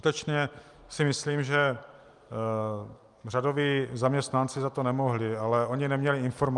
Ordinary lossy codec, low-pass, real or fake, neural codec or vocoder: Opus, 32 kbps; 10.8 kHz; real; none